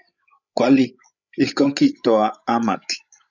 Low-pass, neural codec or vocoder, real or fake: 7.2 kHz; codec, 16 kHz, 16 kbps, FreqCodec, larger model; fake